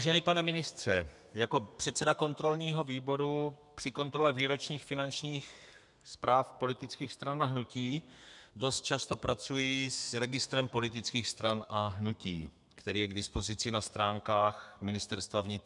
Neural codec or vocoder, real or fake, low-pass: codec, 32 kHz, 1.9 kbps, SNAC; fake; 10.8 kHz